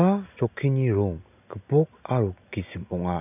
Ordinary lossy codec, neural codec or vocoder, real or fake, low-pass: none; none; real; 3.6 kHz